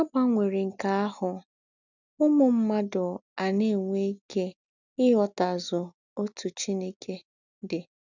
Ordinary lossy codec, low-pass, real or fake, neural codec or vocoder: none; 7.2 kHz; real; none